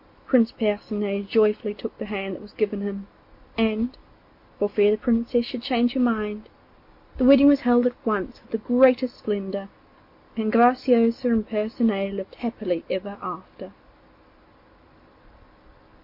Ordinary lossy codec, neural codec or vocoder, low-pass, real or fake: MP3, 32 kbps; none; 5.4 kHz; real